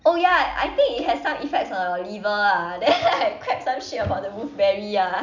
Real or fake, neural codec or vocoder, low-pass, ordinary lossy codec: real; none; 7.2 kHz; none